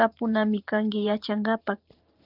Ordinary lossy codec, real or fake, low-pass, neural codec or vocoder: Opus, 32 kbps; fake; 5.4 kHz; vocoder, 44.1 kHz, 128 mel bands every 512 samples, BigVGAN v2